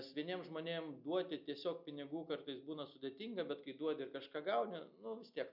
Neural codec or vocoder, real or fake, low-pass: none; real; 5.4 kHz